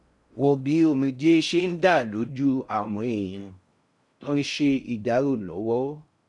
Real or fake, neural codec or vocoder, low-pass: fake; codec, 16 kHz in and 24 kHz out, 0.6 kbps, FocalCodec, streaming, 4096 codes; 10.8 kHz